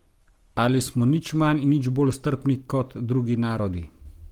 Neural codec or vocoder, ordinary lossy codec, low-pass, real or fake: codec, 44.1 kHz, 7.8 kbps, Pupu-Codec; Opus, 24 kbps; 19.8 kHz; fake